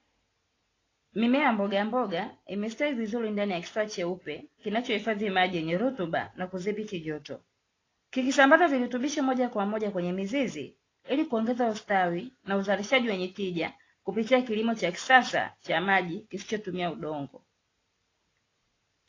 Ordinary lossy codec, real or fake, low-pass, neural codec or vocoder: AAC, 32 kbps; real; 7.2 kHz; none